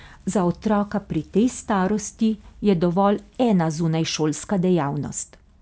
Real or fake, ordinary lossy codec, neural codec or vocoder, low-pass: real; none; none; none